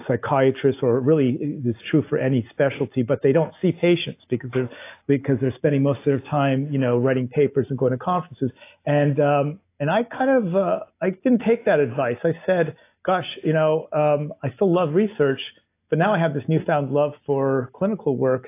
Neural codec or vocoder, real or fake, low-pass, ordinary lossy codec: none; real; 3.6 kHz; AAC, 24 kbps